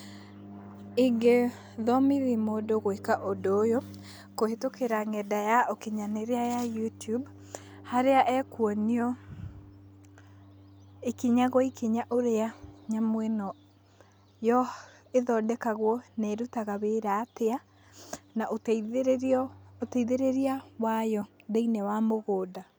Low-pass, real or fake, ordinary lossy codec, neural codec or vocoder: none; real; none; none